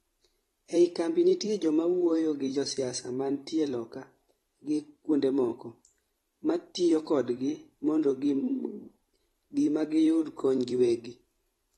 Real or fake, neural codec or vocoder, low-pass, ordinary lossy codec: fake; vocoder, 44.1 kHz, 128 mel bands every 512 samples, BigVGAN v2; 19.8 kHz; AAC, 32 kbps